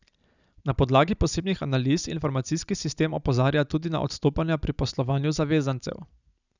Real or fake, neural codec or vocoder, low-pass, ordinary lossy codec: real; none; 7.2 kHz; none